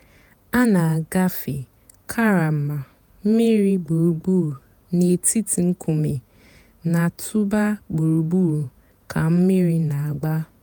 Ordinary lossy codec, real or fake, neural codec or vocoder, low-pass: none; fake; vocoder, 48 kHz, 128 mel bands, Vocos; none